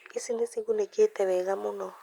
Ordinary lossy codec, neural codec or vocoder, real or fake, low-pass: Opus, 64 kbps; none; real; 19.8 kHz